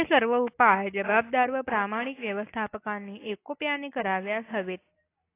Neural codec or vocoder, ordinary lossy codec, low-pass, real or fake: none; AAC, 24 kbps; 3.6 kHz; real